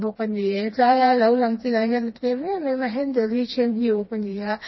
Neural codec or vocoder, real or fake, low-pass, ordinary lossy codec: codec, 16 kHz, 2 kbps, FreqCodec, smaller model; fake; 7.2 kHz; MP3, 24 kbps